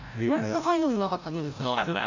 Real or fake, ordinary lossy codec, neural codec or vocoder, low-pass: fake; Opus, 64 kbps; codec, 16 kHz, 0.5 kbps, FreqCodec, larger model; 7.2 kHz